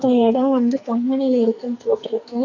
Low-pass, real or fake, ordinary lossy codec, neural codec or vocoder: 7.2 kHz; fake; none; codec, 44.1 kHz, 2.6 kbps, SNAC